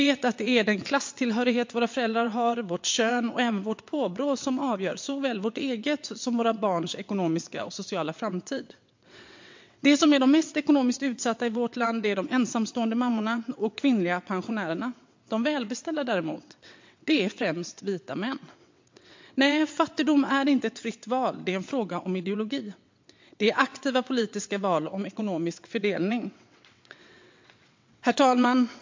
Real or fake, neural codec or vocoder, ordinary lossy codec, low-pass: fake; vocoder, 22.05 kHz, 80 mel bands, WaveNeXt; MP3, 48 kbps; 7.2 kHz